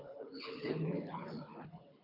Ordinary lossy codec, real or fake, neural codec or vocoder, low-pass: MP3, 48 kbps; fake; codec, 24 kHz, 6 kbps, HILCodec; 5.4 kHz